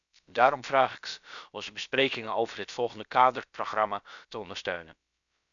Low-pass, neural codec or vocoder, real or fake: 7.2 kHz; codec, 16 kHz, about 1 kbps, DyCAST, with the encoder's durations; fake